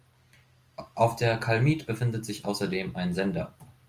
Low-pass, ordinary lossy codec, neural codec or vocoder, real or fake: 14.4 kHz; Opus, 32 kbps; none; real